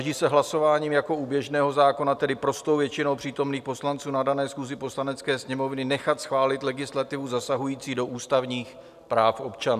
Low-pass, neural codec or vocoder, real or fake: 14.4 kHz; none; real